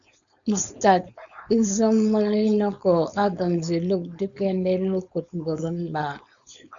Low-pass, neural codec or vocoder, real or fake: 7.2 kHz; codec, 16 kHz, 4.8 kbps, FACodec; fake